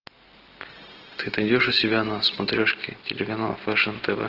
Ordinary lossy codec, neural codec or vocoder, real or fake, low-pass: Opus, 16 kbps; none; real; 5.4 kHz